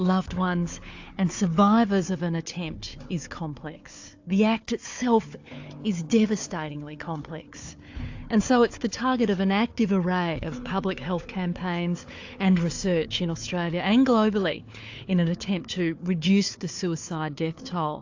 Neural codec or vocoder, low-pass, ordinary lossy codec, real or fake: codec, 16 kHz, 4 kbps, FunCodec, trained on Chinese and English, 50 frames a second; 7.2 kHz; AAC, 48 kbps; fake